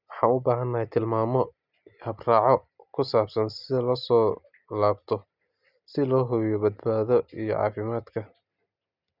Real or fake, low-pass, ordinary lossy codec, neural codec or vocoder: real; 5.4 kHz; none; none